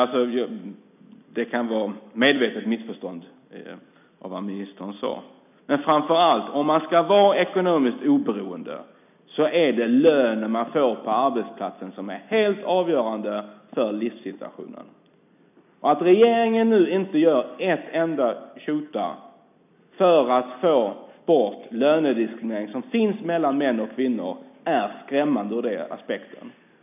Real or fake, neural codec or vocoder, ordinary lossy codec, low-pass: real; none; none; 3.6 kHz